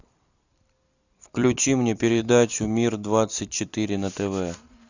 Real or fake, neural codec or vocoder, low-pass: fake; vocoder, 44.1 kHz, 128 mel bands every 256 samples, BigVGAN v2; 7.2 kHz